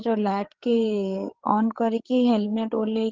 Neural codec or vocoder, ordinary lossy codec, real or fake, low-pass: codec, 16 kHz, 8 kbps, FreqCodec, larger model; Opus, 16 kbps; fake; 7.2 kHz